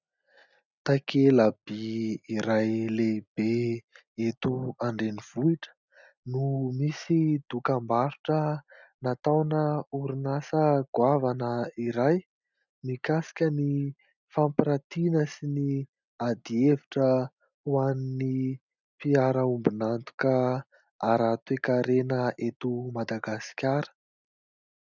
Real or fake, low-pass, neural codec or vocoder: real; 7.2 kHz; none